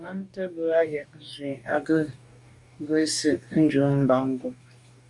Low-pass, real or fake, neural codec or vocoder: 10.8 kHz; fake; codec, 44.1 kHz, 2.6 kbps, DAC